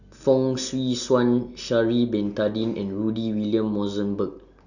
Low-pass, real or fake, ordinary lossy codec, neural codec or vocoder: 7.2 kHz; real; none; none